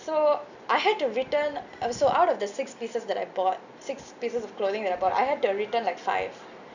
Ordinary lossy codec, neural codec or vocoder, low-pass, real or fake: none; none; 7.2 kHz; real